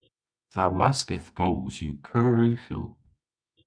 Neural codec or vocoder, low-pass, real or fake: codec, 24 kHz, 0.9 kbps, WavTokenizer, medium music audio release; 9.9 kHz; fake